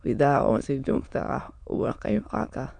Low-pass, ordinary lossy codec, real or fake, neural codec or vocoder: 9.9 kHz; none; fake; autoencoder, 22.05 kHz, a latent of 192 numbers a frame, VITS, trained on many speakers